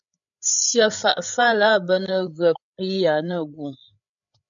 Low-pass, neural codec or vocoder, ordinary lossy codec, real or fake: 7.2 kHz; codec, 16 kHz, 8 kbps, FreqCodec, larger model; MP3, 96 kbps; fake